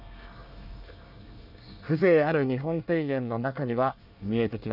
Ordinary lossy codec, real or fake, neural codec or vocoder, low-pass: none; fake; codec, 24 kHz, 1 kbps, SNAC; 5.4 kHz